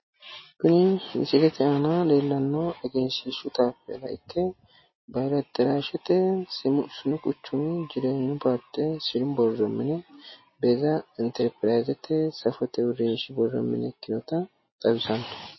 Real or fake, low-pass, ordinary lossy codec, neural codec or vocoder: real; 7.2 kHz; MP3, 24 kbps; none